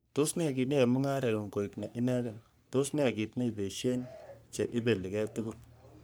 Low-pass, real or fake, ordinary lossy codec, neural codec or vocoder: none; fake; none; codec, 44.1 kHz, 3.4 kbps, Pupu-Codec